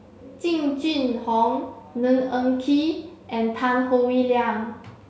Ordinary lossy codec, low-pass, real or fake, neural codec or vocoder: none; none; real; none